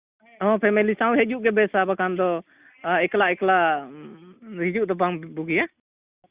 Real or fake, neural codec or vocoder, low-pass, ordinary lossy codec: real; none; 3.6 kHz; Opus, 64 kbps